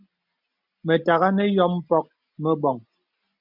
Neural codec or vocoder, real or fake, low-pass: none; real; 5.4 kHz